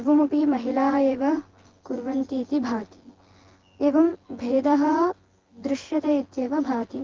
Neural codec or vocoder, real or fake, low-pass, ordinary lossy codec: vocoder, 24 kHz, 100 mel bands, Vocos; fake; 7.2 kHz; Opus, 16 kbps